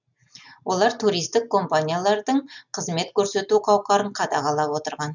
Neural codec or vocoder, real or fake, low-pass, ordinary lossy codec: none; real; 7.2 kHz; none